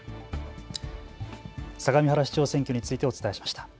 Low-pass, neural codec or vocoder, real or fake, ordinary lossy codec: none; none; real; none